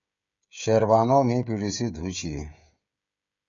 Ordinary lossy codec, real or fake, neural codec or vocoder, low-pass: MP3, 96 kbps; fake; codec, 16 kHz, 16 kbps, FreqCodec, smaller model; 7.2 kHz